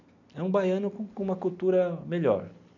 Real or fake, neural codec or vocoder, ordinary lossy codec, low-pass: real; none; none; 7.2 kHz